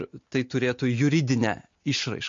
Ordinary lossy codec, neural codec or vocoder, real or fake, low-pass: MP3, 48 kbps; none; real; 7.2 kHz